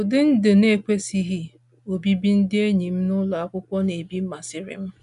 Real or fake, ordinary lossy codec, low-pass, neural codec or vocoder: real; none; 10.8 kHz; none